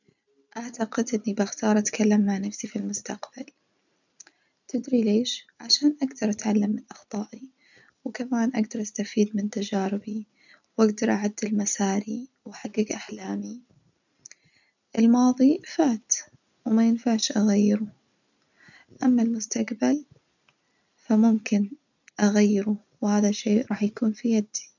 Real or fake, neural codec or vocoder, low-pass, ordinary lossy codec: real; none; 7.2 kHz; none